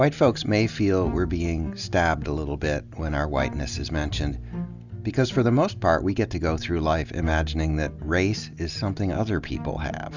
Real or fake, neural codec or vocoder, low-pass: real; none; 7.2 kHz